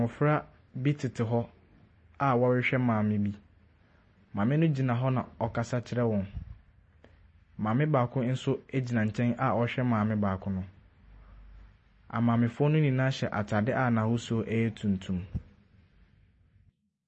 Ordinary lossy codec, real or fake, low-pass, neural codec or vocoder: MP3, 32 kbps; real; 9.9 kHz; none